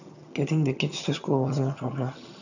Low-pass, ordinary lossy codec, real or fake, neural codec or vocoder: 7.2 kHz; none; fake; vocoder, 22.05 kHz, 80 mel bands, HiFi-GAN